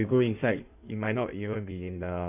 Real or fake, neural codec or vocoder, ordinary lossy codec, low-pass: fake; codec, 16 kHz in and 24 kHz out, 1.1 kbps, FireRedTTS-2 codec; none; 3.6 kHz